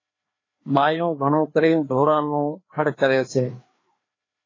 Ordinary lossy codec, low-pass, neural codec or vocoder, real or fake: AAC, 32 kbps; 7.2 kHz; codec, 16 kHz, 2 kbps, FreqCodec, larger model; fake